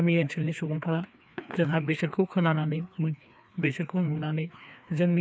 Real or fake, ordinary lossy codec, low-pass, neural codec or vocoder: fake; none; none; codec, 16 kHz, 2 kbps, FreqCodec, larger model